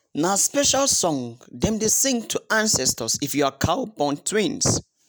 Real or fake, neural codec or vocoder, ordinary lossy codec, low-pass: real; none; none; none